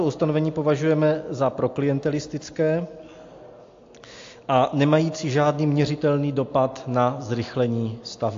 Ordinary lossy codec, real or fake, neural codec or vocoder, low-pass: AAC, 48 kbps; real; none; 7.2 kHz